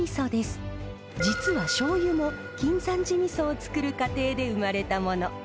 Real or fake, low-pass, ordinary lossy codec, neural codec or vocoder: real; none; none; none